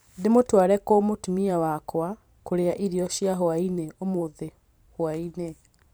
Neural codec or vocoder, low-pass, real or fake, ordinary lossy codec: vocoder, 44.1 kHz, 128 mel bands every 512 samples, BigVGAN v2; none; fake; none